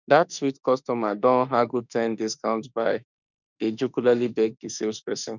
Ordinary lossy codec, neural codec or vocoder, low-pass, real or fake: none; autoencoder, 48 kHz, 32 numbers a frame, DAC-VAE, trained on Japanese speech; 7.2 kHz; fake